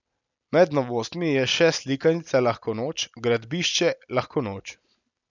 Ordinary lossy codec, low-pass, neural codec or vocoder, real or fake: none; 7.2 kHz; none; real